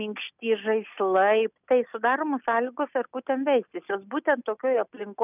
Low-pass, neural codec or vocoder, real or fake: 3.6 kHz; none; real